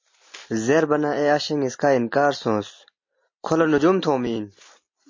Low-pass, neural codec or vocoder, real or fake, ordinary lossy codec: 7.2 kHz; none; real; MP3, 32 kbps